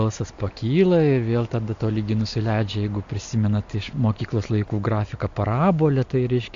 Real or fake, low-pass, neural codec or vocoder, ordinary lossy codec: real; 7.2 kHz; none; AAC, 64 kbps